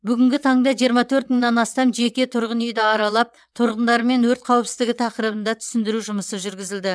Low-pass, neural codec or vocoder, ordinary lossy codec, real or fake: none; vocoder, 22.05 kHz, 80 mel bands, WaveNeXt; none; fake